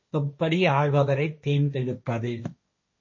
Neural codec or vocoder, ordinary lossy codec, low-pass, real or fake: codec, 16 kHz, 1.1 kbps, Voila-Tokenizer; MP3, 32 kbps; 7.2 kHz; fake